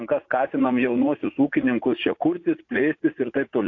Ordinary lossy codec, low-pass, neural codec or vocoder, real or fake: AAC, 48 kbps; 7.2 kHz; vocoder, 44.1 kHz, 80 mel bands, Vocos; fake